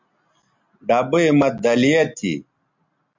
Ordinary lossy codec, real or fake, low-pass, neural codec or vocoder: MP3, 48 kbps; real; 7.2 kHz; none